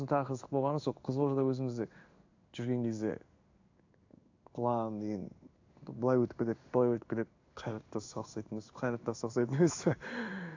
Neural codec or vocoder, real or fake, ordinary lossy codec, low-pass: codec, 16 kHz in and 24 kHz out, 1 kbps, XY-Tokenizer; fake; AAC, 48 kbps; 7.2 kHz